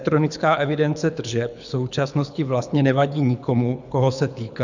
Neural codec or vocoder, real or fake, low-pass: codec, 24 kHz, 6 kbps, HILCodec; fake; 7.2 kHz